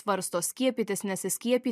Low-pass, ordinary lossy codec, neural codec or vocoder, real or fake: 14.4 kHz; MP3, 96 kbps; none; real